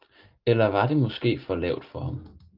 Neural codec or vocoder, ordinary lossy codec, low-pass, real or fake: none; Opus, 32 kbps; 5.4 kHz; real